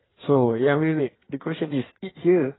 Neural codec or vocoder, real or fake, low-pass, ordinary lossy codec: codec, 16 kHz in and 24 kHz out, 1.1 kbps, FireRedTTS-2 codec; fake; 7.2 kHz; AAC, 16 kbps